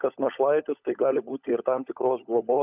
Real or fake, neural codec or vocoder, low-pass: fake; codec, 16 kHz, 16 kbps, FunCodec, trained on LibriTTS, 50 frames a second; 3.6 kHz